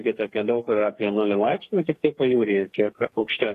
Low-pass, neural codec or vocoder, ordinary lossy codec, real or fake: 14.4 kHz; codec, 44.1 kHz, 2.6 kbps, SNAC; AAC, 48 kbps; fake